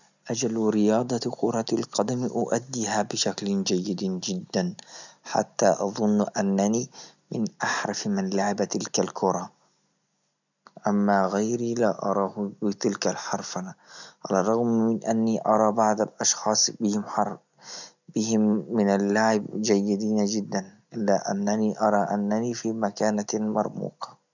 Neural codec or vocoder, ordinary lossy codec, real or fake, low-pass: none; none; real; 7.2 kHz